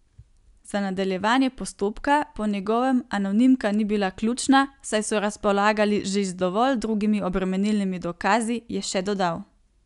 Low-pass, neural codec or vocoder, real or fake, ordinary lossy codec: 10.8 kHz; none; real; none